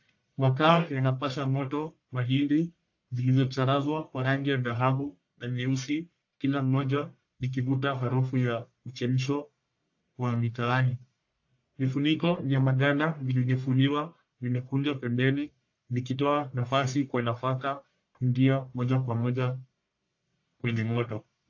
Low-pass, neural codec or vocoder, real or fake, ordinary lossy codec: 7.2 kHz; codec, 44.1 kHz, 1.7 kbps, Pupu-Codec; fake; AAC, 48 kbps